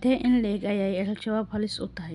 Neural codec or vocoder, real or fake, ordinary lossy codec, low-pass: none; real; none; 10.8 kHz